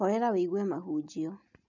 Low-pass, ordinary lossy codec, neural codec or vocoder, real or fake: 7.2 kHz; none; none; real